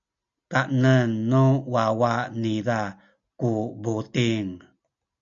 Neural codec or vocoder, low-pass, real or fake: none; 7.2 kHz; real